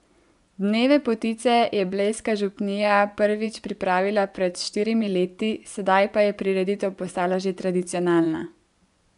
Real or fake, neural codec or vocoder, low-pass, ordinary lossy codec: fake; vocoder, 24 kHz, 100 mel bands, Vocos; 10.8 kHz; none